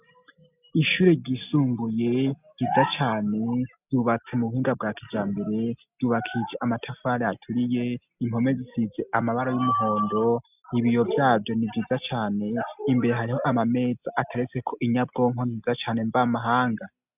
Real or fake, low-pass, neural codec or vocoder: real; 3.6 kHz; none